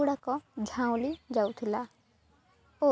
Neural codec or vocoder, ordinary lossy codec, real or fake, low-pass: none; none; real; none